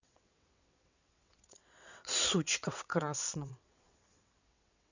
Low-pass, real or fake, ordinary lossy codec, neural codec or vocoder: 7.2 kHz; fake; none; vocoder, 22.05 kHz, 80 mel bands, WaveNeXt